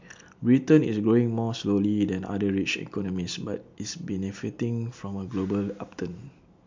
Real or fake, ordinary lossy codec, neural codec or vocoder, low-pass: real; MP3, 64 kbps; none; 7.2 kHz